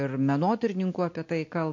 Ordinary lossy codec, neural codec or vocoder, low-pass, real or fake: MP3, 48 kbps; none; 7.2 kHz; real